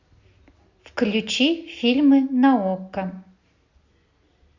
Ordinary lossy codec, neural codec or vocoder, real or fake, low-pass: Opus, 64 kbps; none; real; 7.2 kHz